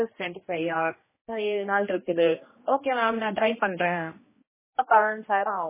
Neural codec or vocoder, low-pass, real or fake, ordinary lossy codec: codec, 16 kHz, 1 kbps, X-Codec, HuBERT features, trained on general audio; 3.6 kHz; fake; MP3, 16 kbps